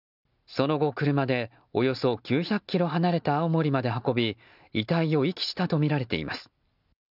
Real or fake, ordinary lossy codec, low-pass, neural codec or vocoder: real; none; 5.4 kHz; none